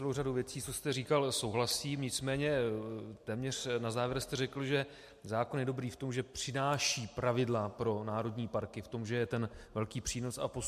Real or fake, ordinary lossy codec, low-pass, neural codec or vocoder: real; MP3, 64 kbps; 14.4 kHz; none